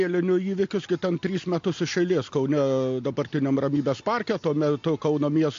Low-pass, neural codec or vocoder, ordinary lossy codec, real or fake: 7.2 kHz; none; AAC, 48 kbps; real